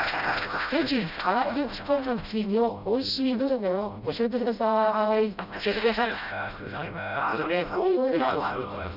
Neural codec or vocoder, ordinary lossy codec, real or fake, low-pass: codec, 16 kHz, 0.5 kbps, FreqCodec, smaller model; none; fake; 5.4 kHz